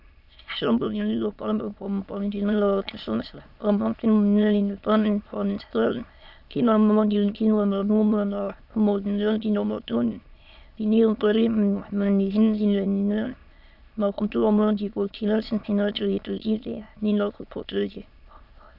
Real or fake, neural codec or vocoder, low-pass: fake; autoencoder, 22.05 kHz, a latent of 192 numbers a frame, VITS, trained on many speakers; 5.4 kHz